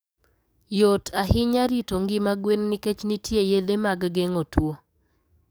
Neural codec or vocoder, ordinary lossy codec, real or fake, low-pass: codec, 44.1 kHz, 7.8 kbps, DAC; none; fake; none